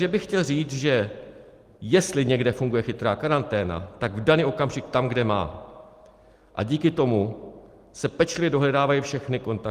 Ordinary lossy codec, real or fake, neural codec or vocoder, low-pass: Opus, 24 kbps; real; none; 14.4 kHz